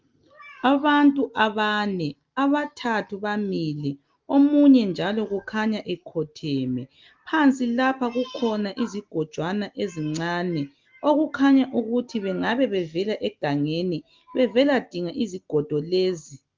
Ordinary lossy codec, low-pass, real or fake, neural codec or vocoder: Opus, 32 kbps; 7.2 kHz; real; none